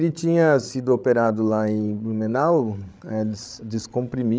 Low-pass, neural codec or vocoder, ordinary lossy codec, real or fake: none; codec, 16 kHz, 16 kbps, FreqCodec, larger model; none; fake